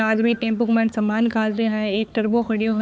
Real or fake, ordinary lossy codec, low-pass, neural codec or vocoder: fake; none; none; codec, 16 kHz, 4 kbps, X-Codec, HuBERT features, trained on balanced general audio